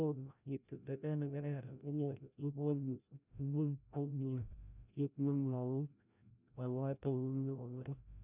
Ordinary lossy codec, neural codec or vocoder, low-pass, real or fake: none; codec, 16 kHz, 0.5 kbps, FreqCodec, larger model; 3.6 kHz; fake